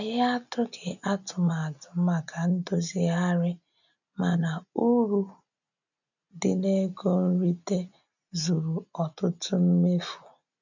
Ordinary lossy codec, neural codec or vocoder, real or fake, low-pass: none; none; real; 7.2 kHz